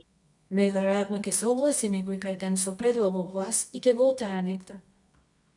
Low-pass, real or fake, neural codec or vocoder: 10.8 kHz; fake; codec, 24 kHz, 0.9 kbps, WavTokenizer, medium music audio release